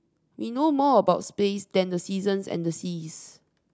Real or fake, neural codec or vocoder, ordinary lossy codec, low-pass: real; none; none; none